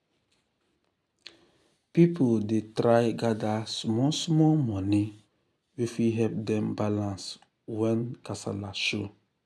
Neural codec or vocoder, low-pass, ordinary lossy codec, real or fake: none; none; none; real